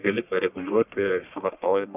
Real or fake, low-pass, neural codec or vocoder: fake; 3.6 kHz; codec, 44.1 kHz, 1.7 kbps, Pupu-Codec